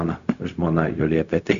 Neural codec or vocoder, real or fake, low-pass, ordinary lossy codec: codec, 16 kHz, 0.4 kbps, LongCat-Audio-Codec; fake; 7.2 kHz; MP3, 96 kbps